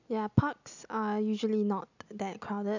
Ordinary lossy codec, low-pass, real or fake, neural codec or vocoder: none; 7.2 kHz; real; none